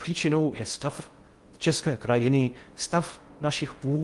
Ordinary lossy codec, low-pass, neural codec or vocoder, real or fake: Opus, 24 kbps; 10.8 kHz; codec, 16 kHz in and 24 kHz out, 0.6 kbps, FocalCodec, streaming, 2048 codes; fake